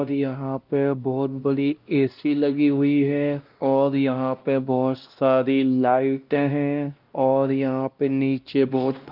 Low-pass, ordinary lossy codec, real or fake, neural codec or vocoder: 5.4 kHz; Opus, 32 kbps; fake; codec, 16 kHz, 1 kbps, X-Codec, WavLM features, trained on Multilingual LibriSpeech